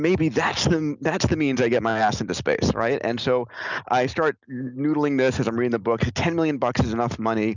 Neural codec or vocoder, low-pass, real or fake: none; 7.2 kHz; real